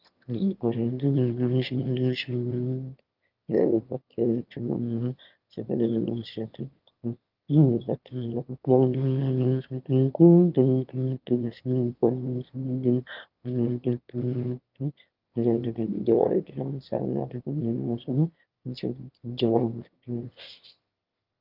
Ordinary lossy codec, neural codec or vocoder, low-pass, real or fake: Opus, 24 kbps; autoencoder, 22.05 kHz, a latent of 192 numbers a frame, VITS, trained on one speaker; 5.4 kHz; fake